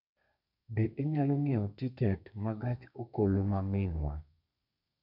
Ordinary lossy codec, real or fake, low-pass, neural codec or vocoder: none; fake; 5.4 kHz; codec, 32 kHz, 1.9 kbps, SNAC